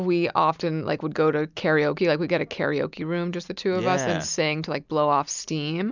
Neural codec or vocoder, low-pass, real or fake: none; 7.2 kHz; real